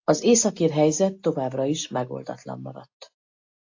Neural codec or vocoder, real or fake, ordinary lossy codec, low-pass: none; real; AAC, 48 kbps; 7.2 kHz